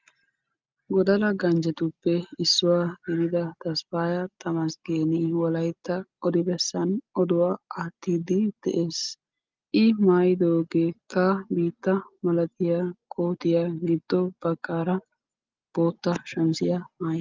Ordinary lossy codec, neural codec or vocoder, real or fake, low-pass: Opus, 32 kbps; none; real; 7.2 kHz